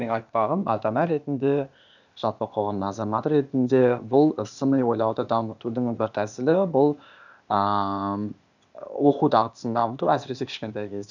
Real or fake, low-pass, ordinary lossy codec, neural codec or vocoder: fake; 7.2 kHz; none; codec, 16 kHz, 0.8 kbps, ZipCodec